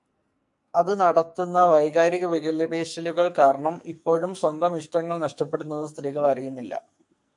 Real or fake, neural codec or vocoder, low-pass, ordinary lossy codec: fake; codec, 44.1 kHz, 2.6 kbps, SNAC; 10.8 kHz; MP3, 64 kbps